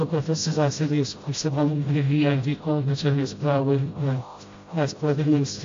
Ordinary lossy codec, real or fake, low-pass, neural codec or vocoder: AAC, 48 kbps; fake; 7.2 kHz; codec, 16 kHz, 0.5 kbps, FreqCodec, smaller model